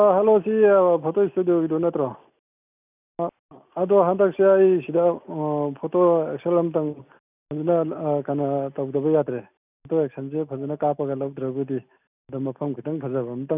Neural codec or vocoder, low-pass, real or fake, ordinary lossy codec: none; 3.6 kHz; real; none